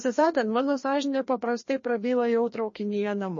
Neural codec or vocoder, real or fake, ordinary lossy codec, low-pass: codec, 16 kHz, 1 kbps, FreqCodec, larger model; fake; MP3, 32 kbps; 7.2 kHz